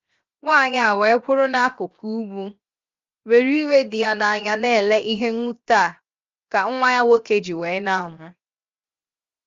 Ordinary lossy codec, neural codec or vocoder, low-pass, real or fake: Opus, 32 kbps; codec, 16 kHz, 0.7 kbps, FocalCodec; 7.2 kHz; fake